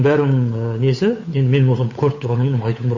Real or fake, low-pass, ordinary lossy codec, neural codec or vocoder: fake; 7.2 kHz; MP3, 32 kbps; codec, 16 kHz, 8 kbps, FunCodec, trained on Chinese and English, 25 frames a second